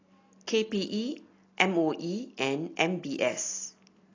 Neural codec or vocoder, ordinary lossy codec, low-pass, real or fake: none; AAC, 32 kbps; 7.2 kHz; real